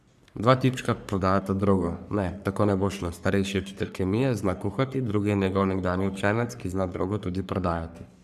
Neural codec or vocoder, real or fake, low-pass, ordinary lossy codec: codec, 44.1 kHz, 3.4 kbps, Pupu-Codec; fake; 14.4 kHz; none